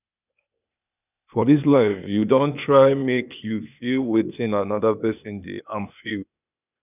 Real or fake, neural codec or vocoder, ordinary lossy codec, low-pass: fake; codec, 16 kHz, 0.8 kbps, ZipCodec; none; 3.6 kHz